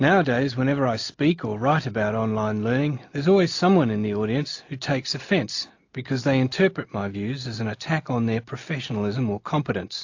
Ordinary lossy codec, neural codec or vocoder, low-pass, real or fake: AAC, 48 kbps; none; 7.2 kHz; real